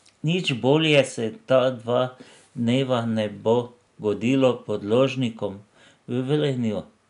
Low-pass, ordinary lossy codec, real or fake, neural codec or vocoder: 10.8 kHz; none; real; none